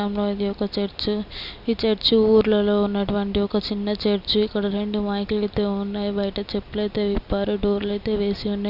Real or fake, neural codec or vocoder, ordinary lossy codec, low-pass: real; none; none; 5.4 kHz